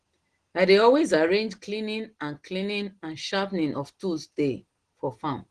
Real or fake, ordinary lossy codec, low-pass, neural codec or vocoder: real; Opus, 16 kbps; 9.9 kHz; none